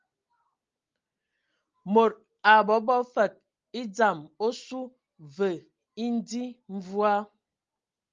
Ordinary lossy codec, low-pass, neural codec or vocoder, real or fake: Opus, 32 kbps; 7.2 kHz; none; real